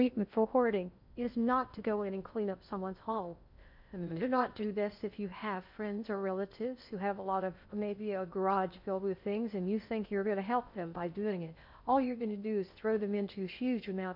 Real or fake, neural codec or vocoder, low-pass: fake; codec, 16 kHz in and 24 kHz out, 0.6 kbps, FocalCodec, streaming, 2048 codes; 5.4 kHz